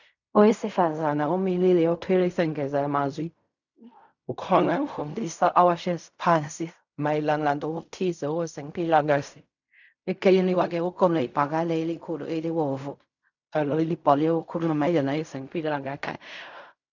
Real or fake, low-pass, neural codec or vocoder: fake; 7.2 kHz; codec, 16 kHz in and 24 kHz out, 0.4 kbps, LongCat-Audio-Codec, fine tuned four codebook decoder